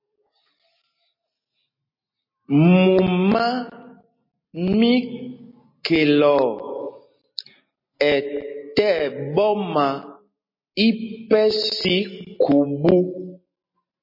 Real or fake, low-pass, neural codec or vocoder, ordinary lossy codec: real; 5.4 kHz; none; MP3, 24 kbps